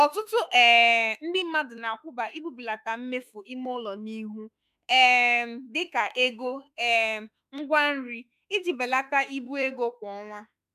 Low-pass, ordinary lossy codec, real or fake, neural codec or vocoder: 14.4 kHz; none; fake; autoencoder, 48 kHz, 32 numbers a frame, DAC-VAE, trained on Japanese speech